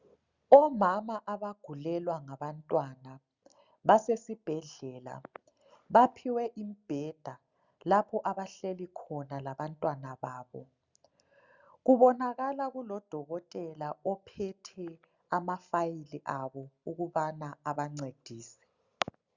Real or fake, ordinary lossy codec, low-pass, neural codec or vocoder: real; Opus, 64 kbps; 7.2 kHz; none